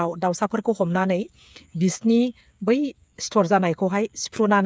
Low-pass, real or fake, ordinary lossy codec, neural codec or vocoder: none; fake; none; codec, 16 kHz, 8 kbps, FreqCodec, smaller model